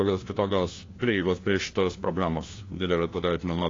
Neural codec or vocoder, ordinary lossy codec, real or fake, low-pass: codec, 16 kHz, 1 kbps, FunCodec, trained on LibriTTS, 50 frames a second; AAC, 32 kbps; fake; 7.2 kHz